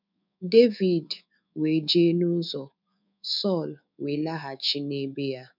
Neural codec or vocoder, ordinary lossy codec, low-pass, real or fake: codec, 24 kHz, 3.1 kbps, DualCodec; none; 5.4 kHz; fake